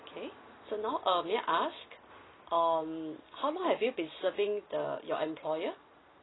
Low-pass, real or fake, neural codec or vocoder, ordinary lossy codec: 7.2 kHz; real; none; AAC, 16 kbps